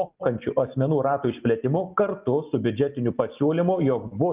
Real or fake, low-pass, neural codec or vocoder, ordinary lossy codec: real; 3.6 kHz; none; Opus, 24 kbps